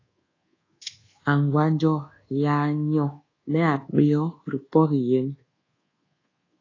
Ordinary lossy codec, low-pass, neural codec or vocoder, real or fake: AAC, 32 kbps; 7.2 kHz; codec, 24 kHz, 1.2 kbps, DualCodec; fake